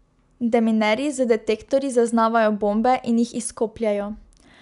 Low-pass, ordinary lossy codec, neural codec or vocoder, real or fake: 10.8 kHz; none; none; real